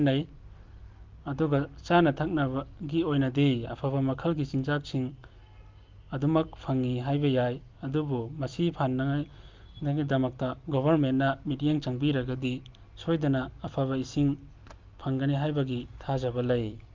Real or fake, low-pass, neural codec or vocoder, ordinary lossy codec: real; 7.2 kHz; none; Opus, 24 kbps